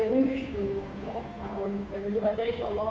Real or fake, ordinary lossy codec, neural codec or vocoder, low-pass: fake; none; codec, 16 kHz, 2 kbps, FunCodec, trained on Chinese and English, 25 frames a second; none